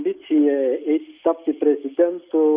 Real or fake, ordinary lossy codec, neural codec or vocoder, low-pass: real; Opus, 64 kbps; none; 3.6 kHz